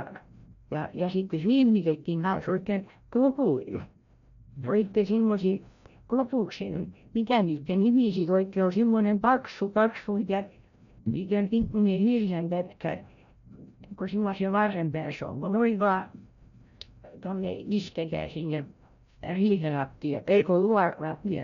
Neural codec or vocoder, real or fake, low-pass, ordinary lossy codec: codec, 16 kHz, 0.5 kbps, FreqCodec, larger model; fake; 7.2 kHz; none